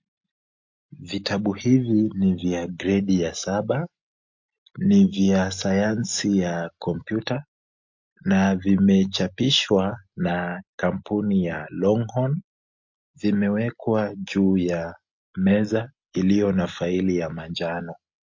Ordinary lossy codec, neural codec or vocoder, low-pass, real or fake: MP3, 48 kbps; none; 7.2 kHz; real